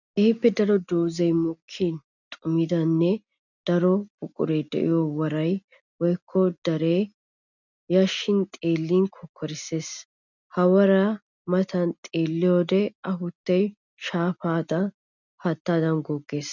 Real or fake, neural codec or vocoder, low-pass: real; none; 7.2 kHz